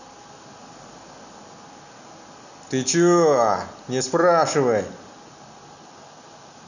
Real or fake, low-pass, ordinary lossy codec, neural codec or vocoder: real; 7.2 kHz; none; none